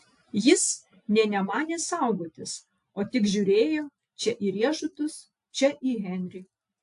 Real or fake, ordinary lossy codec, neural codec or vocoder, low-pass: real; AAC, 64 kbps; none; 10.8 kHz